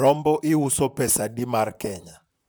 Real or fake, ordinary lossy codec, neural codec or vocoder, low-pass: fake; none; vocoder, 44.1 kHz, 128 mel bands, Pupu-Vocoder; none